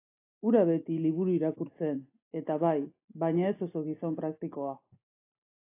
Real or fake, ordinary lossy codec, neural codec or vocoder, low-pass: real; AAC, 24 kbps; none; 3.6 kHz